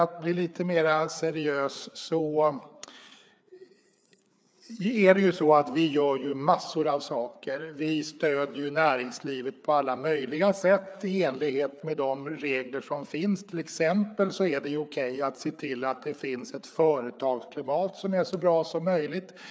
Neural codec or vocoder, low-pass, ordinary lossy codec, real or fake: codec, 16 kHz, 4 kbps, FreqCodec, larger model; none; none; fake